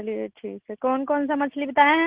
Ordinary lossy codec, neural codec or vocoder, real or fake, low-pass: Opus, 16 kbps; none; real; 3.6 kHz